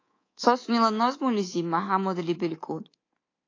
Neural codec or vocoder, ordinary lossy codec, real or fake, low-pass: codec, 24 kHz, 3.1 kbps, DualCodec; AAC, 32 kbps; fake; 7.2 kHz